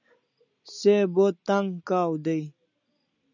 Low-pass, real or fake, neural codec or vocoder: 7.2 kHz; real; none